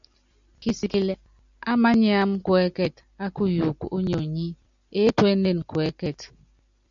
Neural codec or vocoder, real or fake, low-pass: none; real; 7.2 kHz